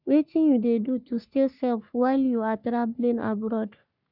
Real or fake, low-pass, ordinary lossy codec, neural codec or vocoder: fake; 5.4 kHz; none; codec, 44.1 kHz, 3.4 kbps, Pupu-Codec